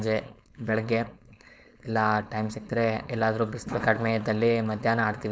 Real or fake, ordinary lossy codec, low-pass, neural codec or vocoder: fake; none; none; codec, 16 kHz, 4.8 kbps, FACodec